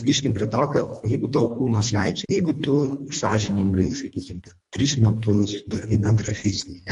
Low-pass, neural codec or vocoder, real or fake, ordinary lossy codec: 10.8 kHz; codec, 24 kHz, 1.5 kbps, HILCodec; fake; MP3, 64 kbps